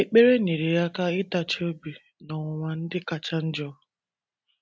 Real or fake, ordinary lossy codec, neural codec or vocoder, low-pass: real; none; none; none